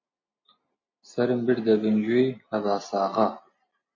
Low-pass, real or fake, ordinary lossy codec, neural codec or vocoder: 7.2 kHz; real; MP3, 32 kbps; none